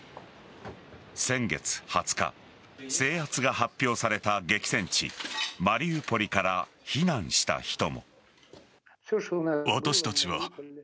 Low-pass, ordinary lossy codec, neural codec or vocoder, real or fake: none; none; none; real